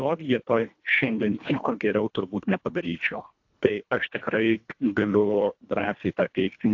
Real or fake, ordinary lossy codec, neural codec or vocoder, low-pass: fake; AAC, 48 kbps; codec, 24 kHz, 1.5 kbps, HILCodec; 7.2 kHz